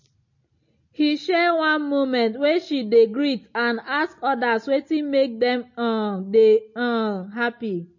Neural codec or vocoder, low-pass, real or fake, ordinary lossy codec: none; 7.2 kHz; real; MP3, 32 kbps